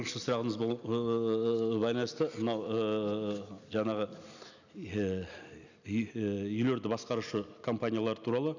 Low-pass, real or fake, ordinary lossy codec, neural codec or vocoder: 7.2 kHz; real; none; none